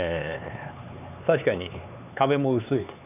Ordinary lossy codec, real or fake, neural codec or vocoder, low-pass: none; fake; codec, 16 kHz, 4 kbps, X-Codec, HuBERT features, trained on LibriSpeech; 3.6 kHz